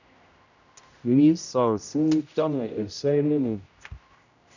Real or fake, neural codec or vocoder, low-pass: fake; codec, 16 kHz, 0.5 kbps, X-Codec, HuBERT features, trained on general audio; 7.2 kHz